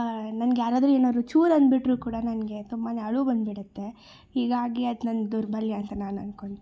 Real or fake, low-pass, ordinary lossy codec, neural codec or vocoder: real; none; none; none